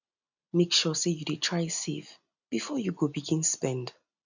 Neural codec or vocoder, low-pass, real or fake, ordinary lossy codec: none; 7.2 kHz; real; none